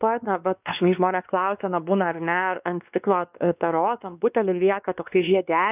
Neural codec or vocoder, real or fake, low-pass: codec, 16 kHz, 2 kbps, X-Codec, WavLM features, trained on Multilingual LibriSpeech; fake; 3.6 kHz